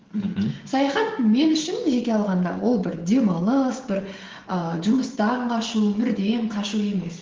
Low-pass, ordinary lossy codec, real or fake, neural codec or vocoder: 7.2 kHz; Opus, 16 kbps; fake; codec, 16 kHz, 16 kbps, FreqCodec, smaller model